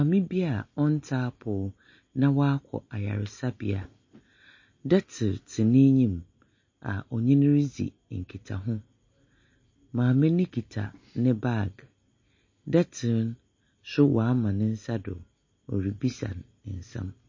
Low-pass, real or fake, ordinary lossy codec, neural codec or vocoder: 7.2 kHz; real; MP3, 32 kbps; none